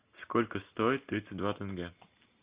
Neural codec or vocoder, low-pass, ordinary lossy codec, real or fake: none; 3.6 kHz; Opus, 64 kbps; real